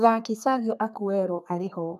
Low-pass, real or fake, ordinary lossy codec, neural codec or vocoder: 14.4 kHz; fake; none; codec, 32 kHz, 1.9 kbps, SNAC